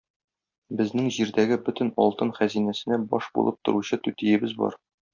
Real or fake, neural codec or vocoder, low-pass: real; none; 7.2 kHz